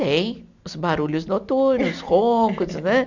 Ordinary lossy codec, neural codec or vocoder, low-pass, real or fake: none; none; 7.2 kHz; real